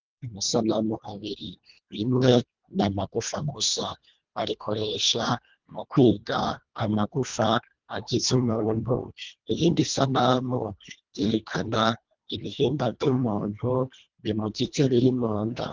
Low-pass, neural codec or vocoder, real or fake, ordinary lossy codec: 7.2 kHz; codec, 24 kHz, 1.5 kbps, HILCodec; fake; Opus, 24 kbps